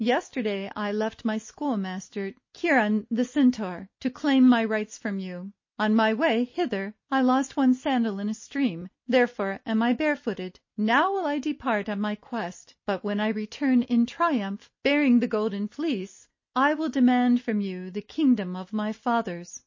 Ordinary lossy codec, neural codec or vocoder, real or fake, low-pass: MP3, 32 kbps; none; real; 7.2 kHz